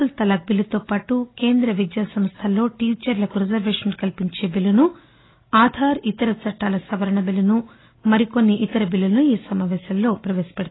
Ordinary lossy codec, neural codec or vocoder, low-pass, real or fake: AAC, 16 kbps; none; 7.2 kHz; real